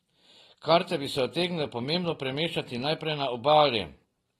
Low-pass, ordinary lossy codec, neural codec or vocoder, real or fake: 19.8 kHz; AAC, 32 kbps; none; real